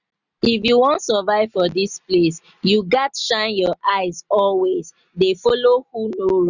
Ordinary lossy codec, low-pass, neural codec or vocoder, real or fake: none; 7.2 kHz; none; real